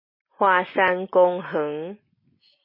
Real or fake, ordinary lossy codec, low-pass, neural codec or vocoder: real; MP3, 24 kbps; 5.4 kHz; none